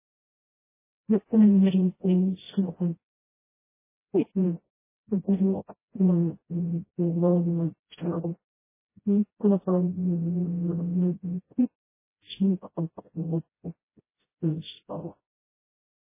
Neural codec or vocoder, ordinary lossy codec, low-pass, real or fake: codec, 16 kHz, 0.5 kbps, FreqCodec, smaller model; MP3, 16 kbps; 3.6 kHz; fake